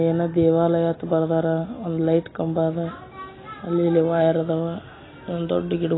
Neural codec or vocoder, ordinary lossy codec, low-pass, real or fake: none; AAC, 16 kbps; 7.2 kHz; real